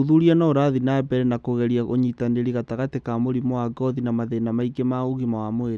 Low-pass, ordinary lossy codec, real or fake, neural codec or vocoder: none; none; real; none